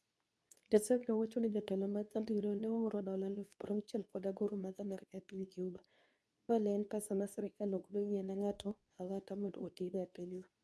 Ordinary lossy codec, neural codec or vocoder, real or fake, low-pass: none; codec, 24 kHz, 0.9 kbps, WavTokenizer, medium speech release version 2; fake; none